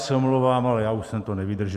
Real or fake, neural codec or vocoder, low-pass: fake; vocoder, 44.1 kHz, 128 mel bands every 256 samples, BigVGAN v2; 14.4 kHz